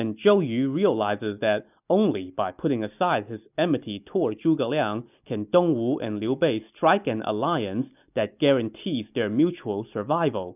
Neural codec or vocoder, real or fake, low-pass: none; real; 3.6 kHz